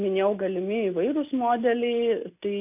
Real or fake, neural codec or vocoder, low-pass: real; none; 3.6 kHz